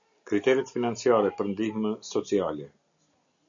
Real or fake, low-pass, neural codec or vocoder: real; 7.2 kHz; none